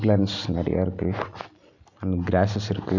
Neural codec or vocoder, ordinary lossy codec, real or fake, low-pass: none; none; real; 7.2 kHz